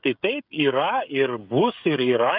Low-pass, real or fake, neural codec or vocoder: 5.4 kHz; fake; codec, 16 kHz, 16 kbps, FreqCodec, smaller model